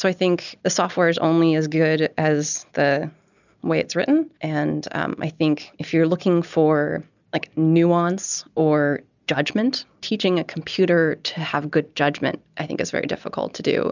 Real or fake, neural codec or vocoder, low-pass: real; none; 7.2 kHz